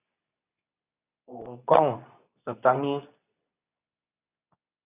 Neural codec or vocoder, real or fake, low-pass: codec, 24 kHz, 0.9 kbps, WavTokenizer, medium speech release version 2; fake; 3.6 kHz